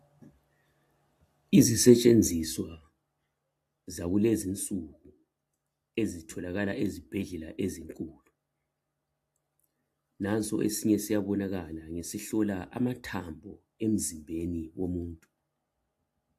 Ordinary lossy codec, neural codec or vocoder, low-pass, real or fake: AAC, 64 kbps; none; 14.4 kHz; real